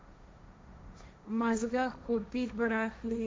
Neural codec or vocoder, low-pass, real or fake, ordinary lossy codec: codec, 16 kHz, 1.1 kbps, Voila-Tokenizer; none; fake; none